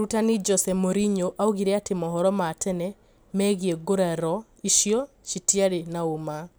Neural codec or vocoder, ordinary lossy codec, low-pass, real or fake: none; none; none; real